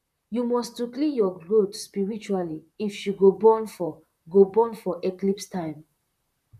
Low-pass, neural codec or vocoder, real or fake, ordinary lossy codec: 14.4 kHz; vocoder, 44.1 kHz, 128 mel bands, Pupu-Vocoder; fake; none